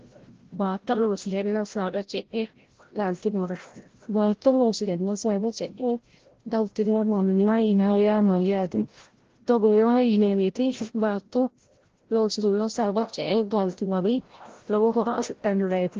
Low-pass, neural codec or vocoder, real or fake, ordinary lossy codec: 7.2 kHz; codec, 16 kHz, 0.5 kbps, FreqCodec, larger model; fake; Opus, 16 kbps